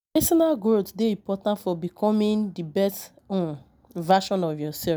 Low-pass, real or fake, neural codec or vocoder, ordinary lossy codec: none; real; none; none